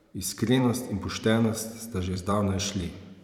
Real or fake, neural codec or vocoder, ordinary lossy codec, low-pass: fake; vocoder, 44.1 kHz, 128 mel bands every 512 samples, BigVGAN v2; none; 19.8 kHz